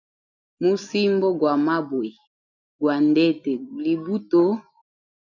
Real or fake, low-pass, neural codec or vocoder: real; 7.2 kHz; none